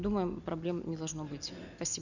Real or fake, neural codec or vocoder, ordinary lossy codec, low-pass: real; none; none; 7.2 kHz